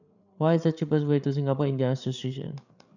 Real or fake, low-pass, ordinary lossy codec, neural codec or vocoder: fake; 7.2 kHz; none; codec, 16 kHz, 8 kbps, FreqCodec, larger model